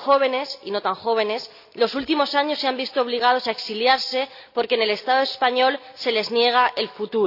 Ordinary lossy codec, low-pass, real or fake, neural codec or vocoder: none; 5.4 kHz; real; none